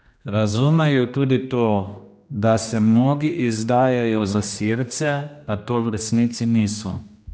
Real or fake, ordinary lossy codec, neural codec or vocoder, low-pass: fake; none; codec, 16 kHz, 1 kbps, X-Codec, HuBERT features, trained on general audio; none